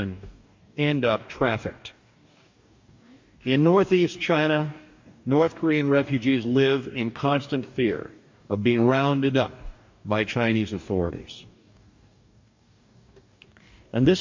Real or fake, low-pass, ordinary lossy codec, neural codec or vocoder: fake; 7.2 kHz; MP3, 48 kbps; codec, 44.1 kHz, 2.6 kbps, DAC